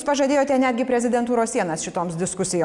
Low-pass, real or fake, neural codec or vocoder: 10.8 kHz; real; none